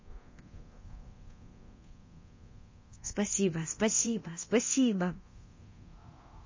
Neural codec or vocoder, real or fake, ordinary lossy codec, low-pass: codec, 24 kHz, 0.9 kbps, DualCodec; fake; MP3, 32 kbps; 7.2 kHz